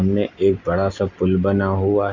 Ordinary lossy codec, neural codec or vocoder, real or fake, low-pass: none; none; real; 7.2 kHz